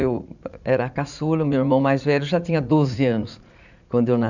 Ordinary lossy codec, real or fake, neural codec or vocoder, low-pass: none; fake; vocoder, 44.1 kHz, 80 mel bands, Vocos; 7.2 kHz